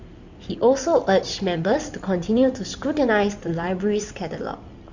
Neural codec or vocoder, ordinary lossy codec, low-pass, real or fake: codec, 16 kHz in and 24 kHz out, 2.2 kbps, FireRedTTS-2 codec; Opus, 64 kbps; 7.2 kHz; fake